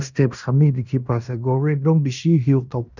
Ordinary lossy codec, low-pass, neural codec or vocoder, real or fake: none; 7.2 kHz; codec, 16 kHz in and 24 kHz out, 0.9 kbps, LongCat-Audio-Codec, fine tuned four codebook decoder; fake